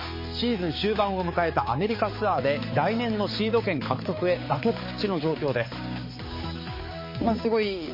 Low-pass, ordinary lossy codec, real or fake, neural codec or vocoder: 5.4 kHz; MP3, 24 kbps; fake; codec, 16 kHz, 4 kbps, X-Codec, HuBERT features, trained on balanced general audio